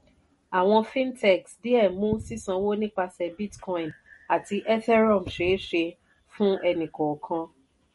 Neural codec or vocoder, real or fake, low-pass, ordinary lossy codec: none; real; 19.8 kHz; MP3, 48 kbps